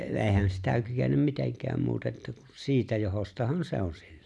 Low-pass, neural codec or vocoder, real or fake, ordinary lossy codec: none; none; real; none